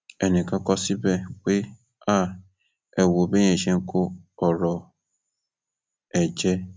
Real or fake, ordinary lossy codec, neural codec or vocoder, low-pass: real; none; none; none